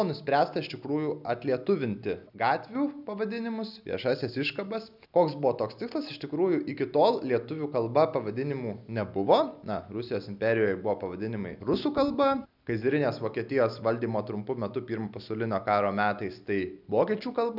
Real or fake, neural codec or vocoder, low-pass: real; none; 5.4 kHz